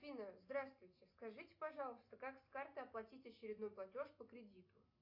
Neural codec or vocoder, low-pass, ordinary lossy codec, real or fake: none; 5.4 kHz; AAC, 48 kbps; real